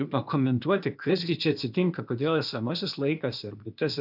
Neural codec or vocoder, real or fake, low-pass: codec, 16 kHz, 0.8 kbps, ZipCodec; fake; 5.4 kHz